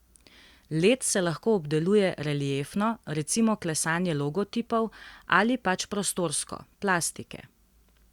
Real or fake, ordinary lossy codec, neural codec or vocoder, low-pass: real; Opus, 64 kbps; none; 19.8 kHz